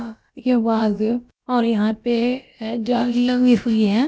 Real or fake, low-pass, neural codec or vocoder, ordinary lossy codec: fake; none; codec, 16 kHz, about 1 kbps, DyCAST, with the encoder's durations; none